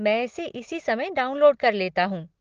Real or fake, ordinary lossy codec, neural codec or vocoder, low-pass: real; Opus, 16 kbps; none; 7.2 kHz